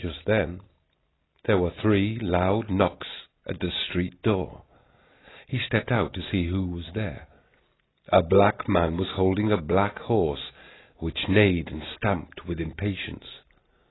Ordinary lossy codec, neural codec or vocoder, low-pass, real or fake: AAC, 16 kbps; none; 7.2 kHz; real